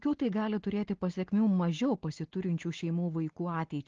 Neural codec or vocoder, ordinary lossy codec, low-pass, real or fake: none; Opus, 16 kbps; 7.2 kHz; real